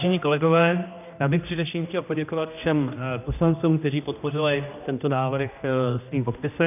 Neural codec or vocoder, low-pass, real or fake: codec, 16 kHz, 1 kbps, X-Codec, HuBERT features, trained on general audio; 3.6 kHz; fake